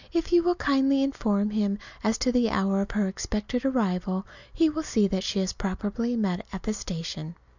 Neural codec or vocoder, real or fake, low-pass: none; real; 7.2 kHz